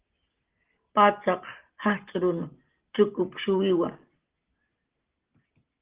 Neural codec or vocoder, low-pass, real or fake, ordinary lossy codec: none; 3.6 kHz; real; Opus, 16 kbps